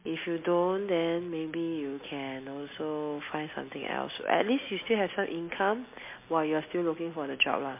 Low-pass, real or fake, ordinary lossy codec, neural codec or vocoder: 3.6 kHz; real; MP3, 24 kbps; none